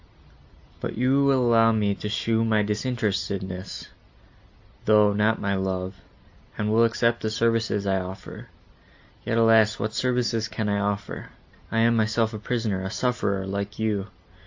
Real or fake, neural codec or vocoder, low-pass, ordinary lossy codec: real; none; 7.2 kHz; AAC, 48 kbps